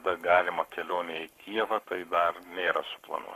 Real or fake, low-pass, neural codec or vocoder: fake; 14.4 kHz; codec, 44.1 kHz, 7.8 kbps, Pupu-Codec